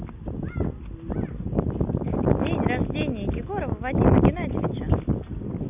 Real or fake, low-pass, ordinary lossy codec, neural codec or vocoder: real; 3.6 kHz; none; none